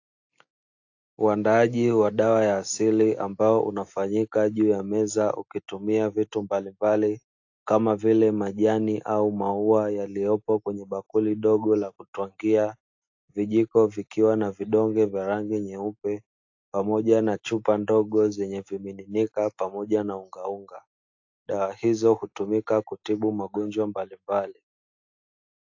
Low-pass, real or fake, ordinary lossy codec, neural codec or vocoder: 7.2 kHz; real; AAC, 48 kbps; none